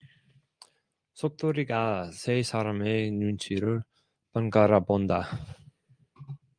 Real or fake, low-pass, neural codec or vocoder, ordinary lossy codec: real; 9.9 kHz; none; Opus, 32 kbps